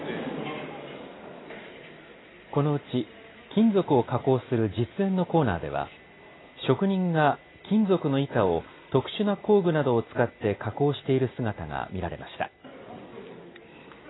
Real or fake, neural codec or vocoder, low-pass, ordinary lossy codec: real; none; 7.2 kHz; AAC, 16 kbps